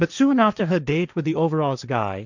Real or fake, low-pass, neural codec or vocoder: fake; 7.2 kHz; codec, 16 kHz, 1.1 kbps, Voila-Tokenizer